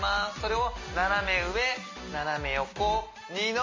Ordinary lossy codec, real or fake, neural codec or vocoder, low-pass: MP3, 32 kbps; real; none; 7.2 kHz